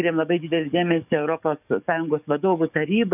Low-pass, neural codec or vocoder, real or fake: 3.6 kHz; codec, 44.1 kHz, 7.8 kbps, DAC; fake